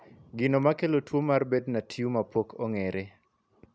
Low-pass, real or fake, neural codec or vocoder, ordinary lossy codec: none; real; none; none